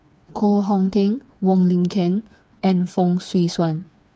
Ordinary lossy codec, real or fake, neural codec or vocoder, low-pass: none; fake; codec, 16 kHz, 4 kbps, FreqCodec, smaller model; none